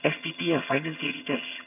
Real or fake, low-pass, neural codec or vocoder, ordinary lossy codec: fake; 3.6 kHz; vocoder, 22.05 kHz, 80 mel bands, HiFi-GAN; none